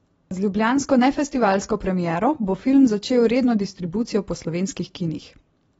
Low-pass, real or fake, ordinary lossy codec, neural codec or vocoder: 19.8 kHz; fake; AAC, 24 kbps; vocoder, 44.1 kHz, 128 mel bands every 256 samples, BigVGAN v2